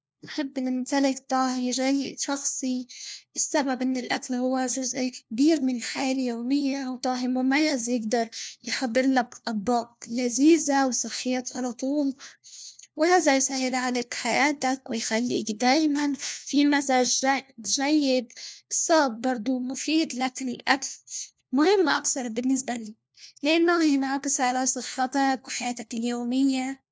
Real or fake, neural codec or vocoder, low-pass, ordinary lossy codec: fake; codec, 16 kHz, 1 kbps, FunCodec, trained on LibriTTS, 50 frames a second; none; none